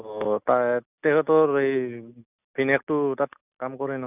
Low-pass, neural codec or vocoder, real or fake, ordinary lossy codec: 3.6 kHz; none; real; none